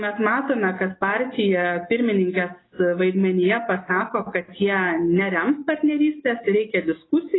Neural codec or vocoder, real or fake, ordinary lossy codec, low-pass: none; real; AAC, 16 kbps; 7.2 kHz